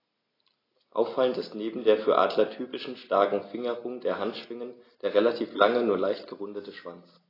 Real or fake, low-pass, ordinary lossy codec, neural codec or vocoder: real; 5.4 kHz; AAC, 24 kbps; none